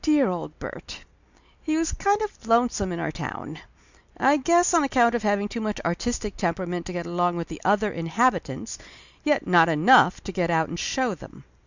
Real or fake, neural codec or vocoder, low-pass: real; none; 7.2 kHz